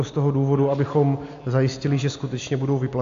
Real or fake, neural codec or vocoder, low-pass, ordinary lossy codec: real; none; 7.2 kHz; AAC, 64 kbps